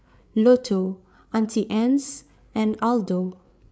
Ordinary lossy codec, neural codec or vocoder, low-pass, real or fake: none; codec, 16 kHz, 8 kbps, FreqCodec, larger model; none; fake